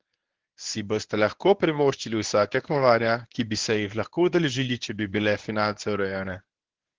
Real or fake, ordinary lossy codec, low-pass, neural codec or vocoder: fake; Opus, 16 kbps; 7.2 kHz; codec, 24 kHz, 0.9 kbps, WavTokenizer, medium speech release version 1